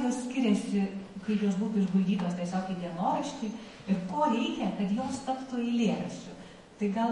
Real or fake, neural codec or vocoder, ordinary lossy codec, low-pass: fake; autoencoder, 48 kHz, 128 numbers a frame, DAC-VAE, trained on Japanese speech; MP3, 48 kbps; 19.8 kHz